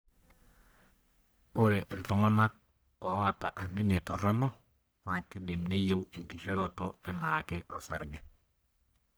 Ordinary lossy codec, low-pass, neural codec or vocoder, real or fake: none; none; codec, 44.1 kHz, 1.7 kbps, Pupu-Codec; fake